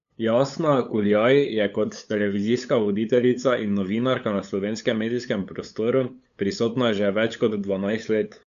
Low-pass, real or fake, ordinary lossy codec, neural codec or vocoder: 7.2 kHz; fake; MP3, 96 kbps; codec, 16 kHz, 8 kbps, FunCodec, trained on LibriTTS, 25 frames a second